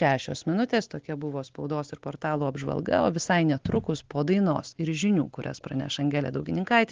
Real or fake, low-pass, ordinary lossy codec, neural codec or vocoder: real; 7.2 kHz; Opus, 16 kbps; none